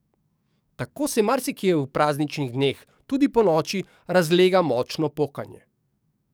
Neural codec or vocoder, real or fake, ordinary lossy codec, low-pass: codec, 44.1 kHz, 7.8 kbps, DAC; fake; none; none